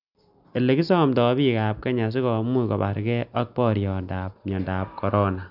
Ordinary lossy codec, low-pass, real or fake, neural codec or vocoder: none; 5.4 kHz; real; none